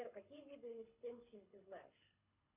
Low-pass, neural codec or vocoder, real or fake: 3.6 kHz; codec, 24 kHz, 6 kbps, HILCodec; fake